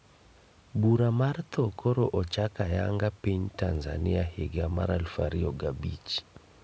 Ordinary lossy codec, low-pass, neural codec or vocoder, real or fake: none; none; none; real